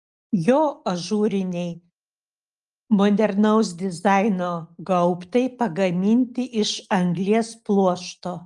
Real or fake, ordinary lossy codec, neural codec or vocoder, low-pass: real; Opus, 32 kbps; none; 10.8 kHz